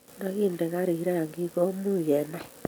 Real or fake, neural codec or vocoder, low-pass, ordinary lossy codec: real; none; none; none